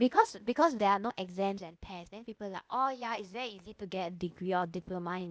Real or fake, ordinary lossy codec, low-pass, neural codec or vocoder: fake; none; none; codec, 16 kHz, 0.8 kbps, ZipCodec